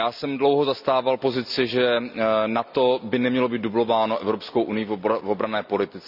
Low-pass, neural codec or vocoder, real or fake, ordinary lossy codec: 5.4 kHz; none; real; none